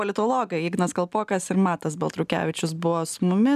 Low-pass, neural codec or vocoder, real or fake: 14.4 kHz; none; real